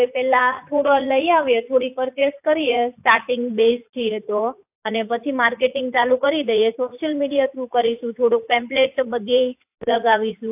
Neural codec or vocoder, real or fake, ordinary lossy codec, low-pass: vocoder, 44.1 kHz, 80 mel bands, Vocos; fake; AAC, 32 kbps; 3.6 kHz